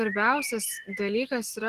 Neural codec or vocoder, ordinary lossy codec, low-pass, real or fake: none; Opus, 16 kbps; 14.4 kHz; real